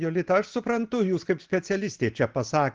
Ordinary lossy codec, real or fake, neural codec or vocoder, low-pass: Opus, 16 kbps; real; none; 7.2 kHz